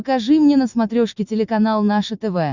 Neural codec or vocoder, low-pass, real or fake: none; 7.2 kHz; real